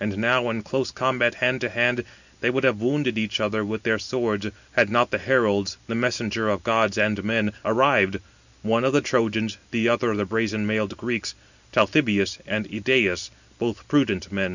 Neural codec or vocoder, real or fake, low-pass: none; real; 7.2 kHz